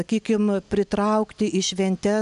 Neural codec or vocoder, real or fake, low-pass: none; real; 10.8 kHz